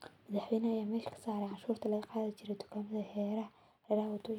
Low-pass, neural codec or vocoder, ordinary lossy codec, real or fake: 19.8 kHz; none; none; real